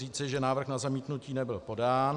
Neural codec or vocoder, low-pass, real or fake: none; 10.8 kHz; real